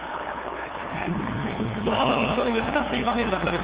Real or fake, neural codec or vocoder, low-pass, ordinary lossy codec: fake; codec, 16 kHz, 2 kbps, FunCodec, trained on LibriTTS, 25 frames a second; 3.6 kHz; Opus, 32 kbps